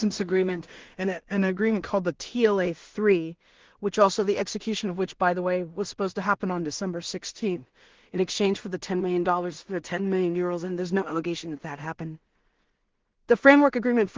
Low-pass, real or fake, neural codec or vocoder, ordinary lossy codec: 7.2 kHz; fake; codec, 16 kHz in and 24 kHz out, 0.4 kbps, LongCat-Audio-Codec, two codebook decoder; Opus, 16 kbps